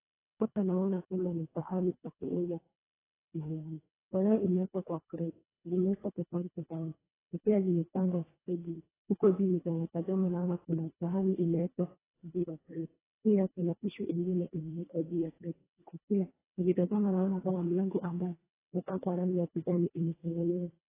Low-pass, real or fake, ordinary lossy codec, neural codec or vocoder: 3.6 kHz; fake; AAC, 16 kbps; codec, 24 kHz, 1.5 kbps, HILCodec